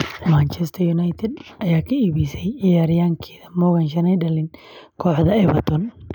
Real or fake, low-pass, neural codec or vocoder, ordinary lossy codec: real; 19.8 kHz; none; none